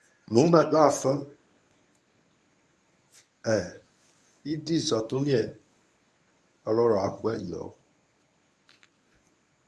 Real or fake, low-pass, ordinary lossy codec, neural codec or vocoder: fake; none; none; codec, 24 kHz, 0.9 kbps, WavTokenizer, medium speech release version 1